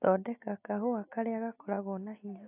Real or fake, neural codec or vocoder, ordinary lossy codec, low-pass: real; none; AAC, 32 kbps; 3.6 kHz